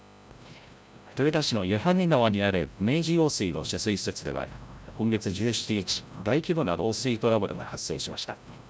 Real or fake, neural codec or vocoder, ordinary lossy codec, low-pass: fake; codec, 16 kHz, 0.5 kbps, FreqCodec, larger model; none; none